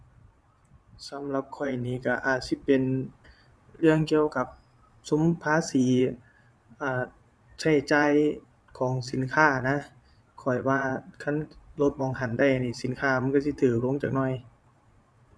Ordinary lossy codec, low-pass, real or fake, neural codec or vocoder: none; none; fake; vocoder, 22.05 kHz, 80 mel bands, Vocos